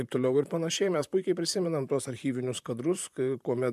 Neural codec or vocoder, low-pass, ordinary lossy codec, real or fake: vocoder, 44.1 kHz, 128 mel bands, Pupu-Vocoder; 14.4 kHz; MP3, 96 kbps; fake